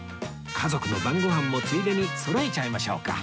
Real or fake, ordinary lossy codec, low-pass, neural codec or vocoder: real; none; none; none